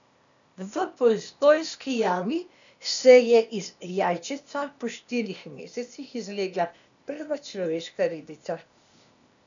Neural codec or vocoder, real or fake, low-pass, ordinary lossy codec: codec, 16 kHz, 0.8 kbps, ZipCodec; fake; 7.2 kHz; none